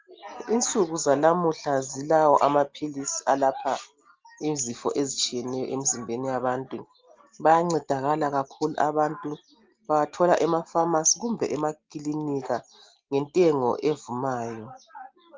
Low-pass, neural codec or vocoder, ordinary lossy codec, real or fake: 7.2 kHz; none; Opus, 24 kbps; real